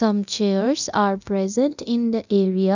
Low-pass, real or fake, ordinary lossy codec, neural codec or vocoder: 7.2 kHz; fake; none; codec, 24 kHz, 0.9 kbps, DualCodec